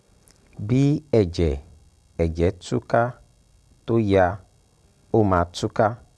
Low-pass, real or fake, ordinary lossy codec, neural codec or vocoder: none; real; none; none